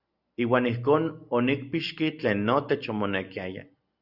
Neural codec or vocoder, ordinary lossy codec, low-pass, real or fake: none; Opus, 64 kbps; 5.4 kHz; real